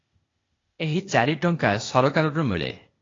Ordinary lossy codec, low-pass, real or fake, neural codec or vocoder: AAC, 32 kbps; 7.2 kHz; fake; codec, 16 kHz, 0.8 kbps, ZipCodec